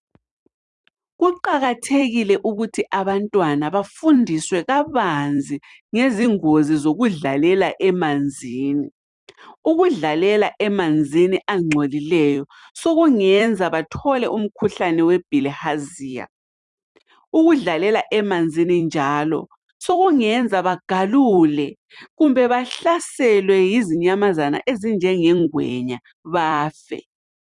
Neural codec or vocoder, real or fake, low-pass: vocoder, 44.1 kHz, 128 mel bands every 256 samples, BigVGAN v2; fake; 10.8 kHz